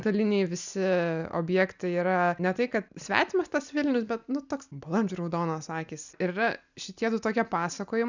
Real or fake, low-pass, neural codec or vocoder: real; 7.2 kHz; none